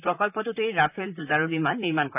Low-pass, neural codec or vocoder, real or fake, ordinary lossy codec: 3.6 kHz; vocoder, 44.1 kHz, 128 mel bands, Pupu-Vocoder; fake; none